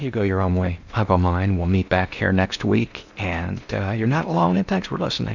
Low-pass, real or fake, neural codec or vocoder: 7.2 kHz; fake; codec, 16 kHz in and 24 kHz out, 0.8 kbps, FocalCodec, streaming, 65536 codes